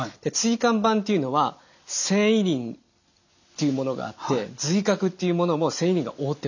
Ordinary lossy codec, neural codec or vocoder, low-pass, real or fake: none; none; 7.2 kHz; real